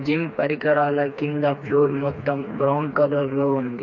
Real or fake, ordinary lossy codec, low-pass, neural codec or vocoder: fake; MP3, 48 kbps; 7.2 kHz; codec, 16 kHz, 2 kbps, FreqCodec, smaller model